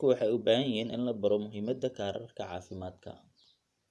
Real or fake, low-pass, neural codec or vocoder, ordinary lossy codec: fake; none; vocoder, 24 kHz, 100 mel bands, Vocos; none